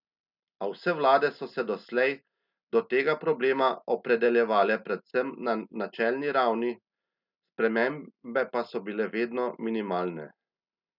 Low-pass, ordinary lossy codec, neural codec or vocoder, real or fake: 5.4 kHz; none; none; real